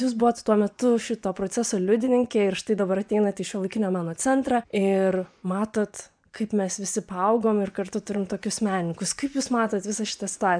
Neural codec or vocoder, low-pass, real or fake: vocoder, 24 kHz, 100 mel bands, Vocos; 9.9 kHz; fake